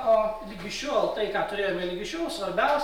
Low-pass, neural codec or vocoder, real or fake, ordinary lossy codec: 19.8 kHz; none; real; MP3, 96 kbps